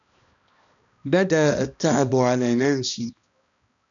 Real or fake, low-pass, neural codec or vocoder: fake; 7.2 kHz; codec, 16 kHz, 1 kbps, X-Codec, HuBERT features, trained on balanced general audio